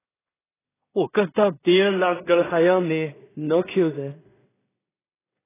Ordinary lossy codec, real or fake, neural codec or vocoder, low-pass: AAC, 16 kbps; fake; codec, 16 kHz in and 24 kHz out, 0.4 kbps, LongCat-Audio-Codec, two codebook decoder; 3.6 kHz